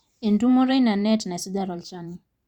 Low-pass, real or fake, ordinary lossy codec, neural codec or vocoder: 19.8 kHz; fake; Opus, 64 kbps; vocoder, 44.1 kHz, 128 mel bands every 512 samples, BigVGAN v2